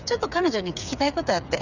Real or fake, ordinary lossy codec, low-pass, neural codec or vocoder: fake; none; 7.2 kHz; codec, 16 kHz, 8 kbps, FreqCodec, smaller model